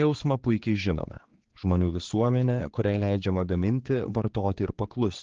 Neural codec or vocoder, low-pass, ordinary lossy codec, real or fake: codec, 16 kHz, 4 kbps, X-Codec, HuBERT features, trained on general audio; 7.2 kHz; Opus, 16 kbps; fake